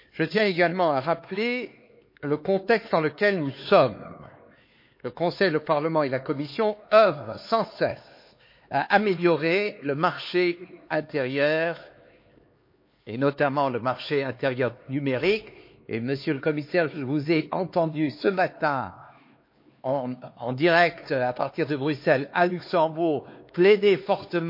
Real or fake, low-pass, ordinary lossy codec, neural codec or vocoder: fake; 5.4 kHz; MP3, 32 kbps; codec, 16 kHz, 4 kbps, X-Codec, HuBERT features, trained on LibriSpeech